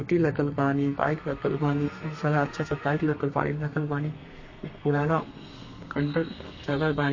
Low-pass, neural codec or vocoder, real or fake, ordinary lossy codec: 7.2 kHz; codec, 32 kHz, 1.9 kbps, SNAC; fake; MP3, 32 kbps